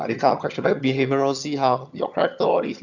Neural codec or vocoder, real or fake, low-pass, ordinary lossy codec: vocoder, 22.05 kHz, 80 mel bands, HiFi-GAN; fake; 7.2 kHz; none